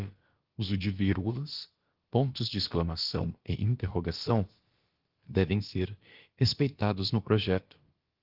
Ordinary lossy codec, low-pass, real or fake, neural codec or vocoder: Opus, 16 kbps; 5.4 kHz; fake; codec, 16 kHz, about 1 kbps, DyCAST, with the encoder's durations